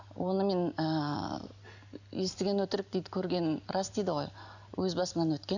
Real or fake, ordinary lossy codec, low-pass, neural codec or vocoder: real; none; 7.2 kHz; none